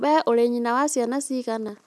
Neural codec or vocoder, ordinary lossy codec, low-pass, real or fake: none; none; none; real